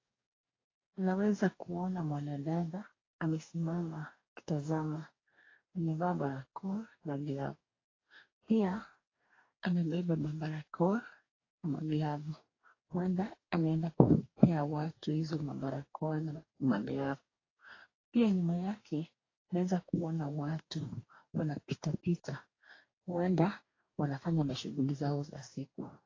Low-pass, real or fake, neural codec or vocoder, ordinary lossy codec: 7.2 kHz; fake; codec, 44.1 kHz, 2.6 kbps, DAC; AAC, 32 kbps